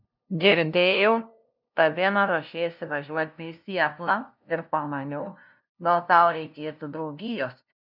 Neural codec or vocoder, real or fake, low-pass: codec, 16 kHz, 0.5 kbps, FunCodec, trained on LibriTTS, 25 frames a second; fake; 5.4 kHz